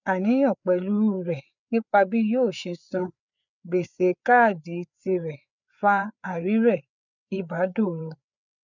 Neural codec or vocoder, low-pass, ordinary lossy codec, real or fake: codec, 16 kHz, 4 kbps, FreqCodec, larger model; 7.2 kHz; none; fake